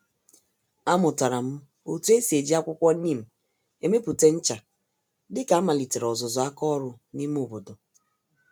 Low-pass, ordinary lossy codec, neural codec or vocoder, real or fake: none; none; none; real